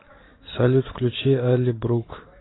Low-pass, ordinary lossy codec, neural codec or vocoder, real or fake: 7.2 kHz; AAC, 16 kbps; none; real